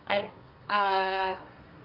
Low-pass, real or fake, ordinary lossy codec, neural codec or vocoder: 5.4 kHz; fake; Opus, 24 kbps; codec, 24 kHz, 1 kbps, SNAC